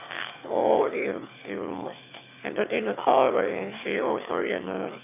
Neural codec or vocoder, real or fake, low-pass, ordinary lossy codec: autoencoder, 22.05 kHz, a latent of 192 numbers a frame, VITS, trained on one speaker; fake; 3.6 kHz; none